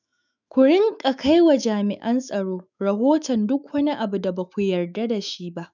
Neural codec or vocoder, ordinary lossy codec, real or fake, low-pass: autoencoder, 48 kHz, 128 numbers a frame, DAC-VAE, trained on Japanese speech; none; fake; 7.2 kHz